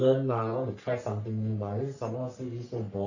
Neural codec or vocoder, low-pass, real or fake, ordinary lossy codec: codec, 44.1 kHz, 3.4 kbps, Pupu-Codec; 7.2 kHz; fake; none